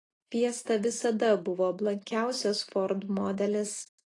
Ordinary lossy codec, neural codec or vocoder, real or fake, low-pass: AAC, 32 kbps; none; real; 10.8 kHz